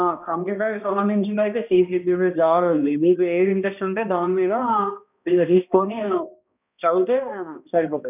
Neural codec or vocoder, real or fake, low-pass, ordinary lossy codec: codec, 16 kHz, 1 kbps, X-Codec, HuBERT features, trained on balanced general audio; fake; 3.6 kHz; none